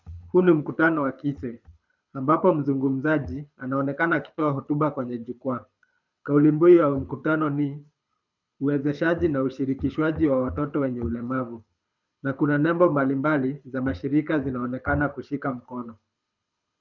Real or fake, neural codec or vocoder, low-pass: fake; codec, 24 kHz, 6 kbps, HILCodec; 7.2 kHz